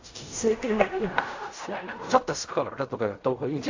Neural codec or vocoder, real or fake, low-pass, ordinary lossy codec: codec, 16 kHz in and 24 kHz out, 0.4 kbps, LongCat-Audio-Codec, fine tuned four codebook decoder; fake; 7.2 kHz; AAC, 48 kbps